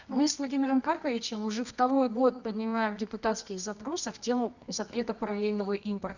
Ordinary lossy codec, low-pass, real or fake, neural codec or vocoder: none; 7.2 kHz; fake; codec, 24 kHz, 0.9 kbps, WavTokenizer, medium music audio release